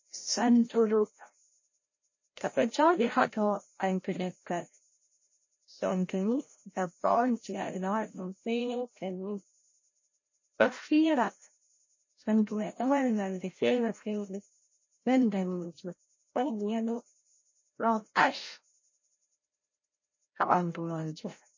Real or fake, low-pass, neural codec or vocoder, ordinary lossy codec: fake; 7.2 kHz; codec, 16 kHz, 0.5 kbps, FreqCodec, larger model; MP3, 32 kbps